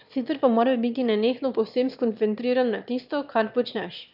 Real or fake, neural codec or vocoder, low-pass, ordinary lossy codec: fake; autoencoder, 22.05 kHz, a latent of 192 numbers a frame, VITS, trained on one speaker; 5.4 kHz; none